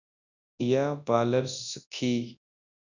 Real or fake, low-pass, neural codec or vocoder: fake; 7.2 kHz; codec, 24 kHz, 0.9 kbps, WavTokenizer, large speech release